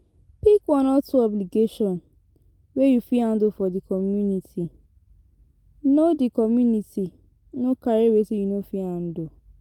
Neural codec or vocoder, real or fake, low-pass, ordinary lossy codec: none; real; 19.8 kHz; Opus, 24 kbps